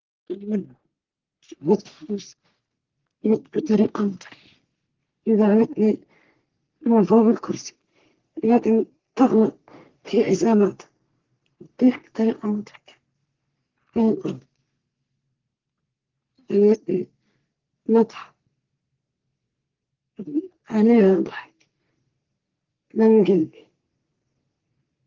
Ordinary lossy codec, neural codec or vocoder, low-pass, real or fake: Opus, 16 kbps; codec, 44.1 kHz, 3.4 kbps, Pupu-Codec; 7.2 kHz; fake